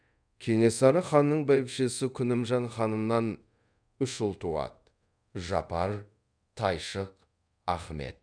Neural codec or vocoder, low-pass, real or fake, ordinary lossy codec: codec, 24 kHz, 0.5 kbps, DualCodec; 9.9 kHz; fake; none